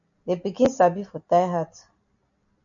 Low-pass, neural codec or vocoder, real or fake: 7.2 kHz; none; real